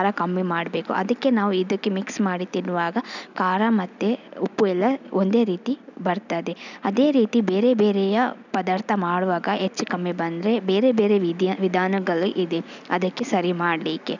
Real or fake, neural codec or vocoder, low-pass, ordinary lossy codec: real; none; 7.2 kHz; none